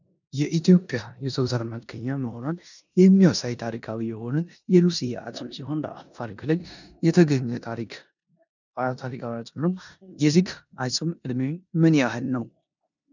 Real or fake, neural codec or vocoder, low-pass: fake; codec, 16 kHz in and 24 kHz out, 0.9 kbps, LongCat-Audio-Codec, four codebook decoder; 7.2 kHz